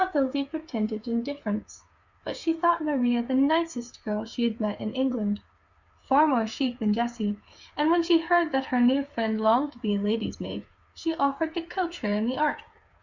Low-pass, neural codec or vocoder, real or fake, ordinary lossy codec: 7.2 kHz; codec, 16 kHz, 8 kbps, FreqCodec, smaller model; fake; Opus, 64 kbps